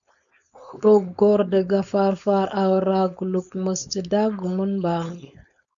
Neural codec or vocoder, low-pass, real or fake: codec, 16 kHz, 4.8 kbps, FACodec; 7.2 kHz; fake